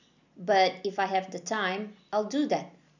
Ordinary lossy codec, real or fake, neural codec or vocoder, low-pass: none; real; none; 7.2 kHz